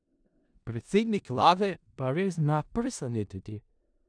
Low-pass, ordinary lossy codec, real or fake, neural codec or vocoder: 9.9 kHz; none; fake; codec, 16 kHz in and 24 kHz out, 0.4 kbps, LongCat-Audio-Codec, four codebook decoder